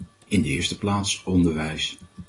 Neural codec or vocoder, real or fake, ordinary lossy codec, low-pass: none; real; AAC, 32 kbps; 10.8 kHz